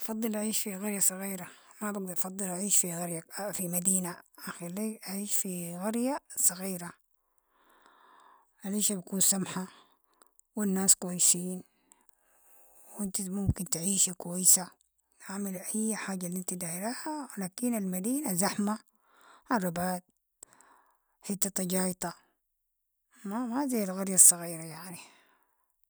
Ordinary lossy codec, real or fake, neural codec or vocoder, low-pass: none; real; none; none